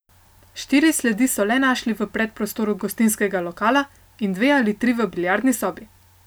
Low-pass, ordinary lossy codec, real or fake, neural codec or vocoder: none; none; real; none